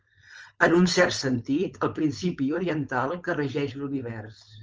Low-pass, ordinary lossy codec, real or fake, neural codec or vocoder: 7.2 kHz; Opus, 24 kbps; fake; codec, 16 kHz, 4.8 kbps, FACodec